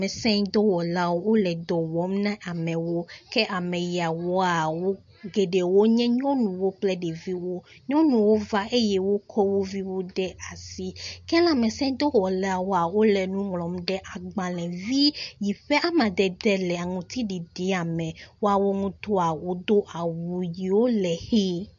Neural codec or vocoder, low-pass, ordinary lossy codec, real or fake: none; 7.2 kHz; MP3, 48 kbps; real